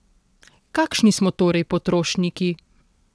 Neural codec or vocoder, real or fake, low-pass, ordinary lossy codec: vocoder, 22.05 kHz, 80 mel bands, WaveNeXt; fake; none; none